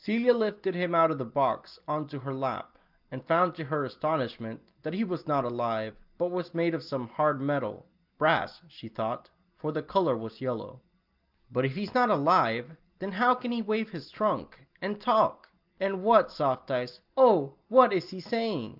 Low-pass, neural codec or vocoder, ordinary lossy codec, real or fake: 5.4 kHz; none; Opus, 32 kbps; real